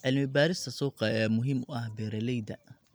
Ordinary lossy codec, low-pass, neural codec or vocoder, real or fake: none; none; none; real